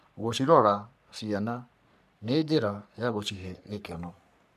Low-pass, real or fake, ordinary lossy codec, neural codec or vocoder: 14.4 kHz; fake; none; codec, 44.1 kHz, 3.4 kbps, Pupu-Codec